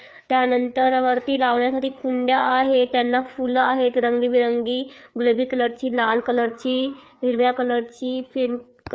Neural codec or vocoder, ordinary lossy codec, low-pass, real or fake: codec, 16 kHz, 4 kbps, FreqCodec, larger model; none; none; fake